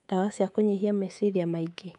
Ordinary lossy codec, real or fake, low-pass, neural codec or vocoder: none; fake; 10.8 kHz; codec, 24 kHz, 3.1 kbps, DualCodec